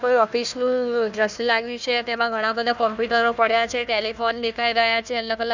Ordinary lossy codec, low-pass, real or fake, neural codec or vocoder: none; 7.2 kHz; fake; codec, 16 kHz, 1 kbps, FunCodec, trained on Chinese and English, 50 frames a second